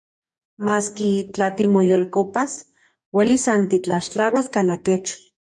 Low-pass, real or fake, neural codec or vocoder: 10.8 kHz; fake; codec, 44.1 kHz, 2.6 kbps, DAC